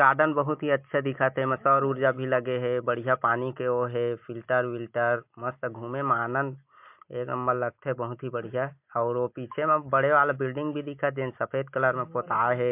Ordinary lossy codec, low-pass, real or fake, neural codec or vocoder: MP3, 32 kbps; 3.6 kHz; real; none